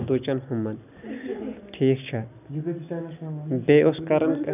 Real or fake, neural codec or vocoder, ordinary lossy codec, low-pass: real; none; none; 3.6 kHz